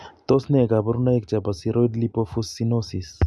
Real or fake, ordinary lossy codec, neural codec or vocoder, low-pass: real; none; none; none